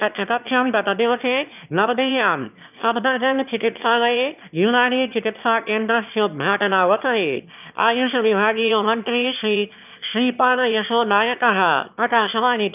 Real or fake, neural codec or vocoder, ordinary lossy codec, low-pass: fake; autoencoder, 22.05 kHz, a latent of 192 numbers a frame, VITS, trained on one speaker; none; 3.6 kHz